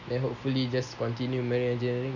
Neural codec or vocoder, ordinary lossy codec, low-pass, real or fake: none; none; 7.2 kHz; real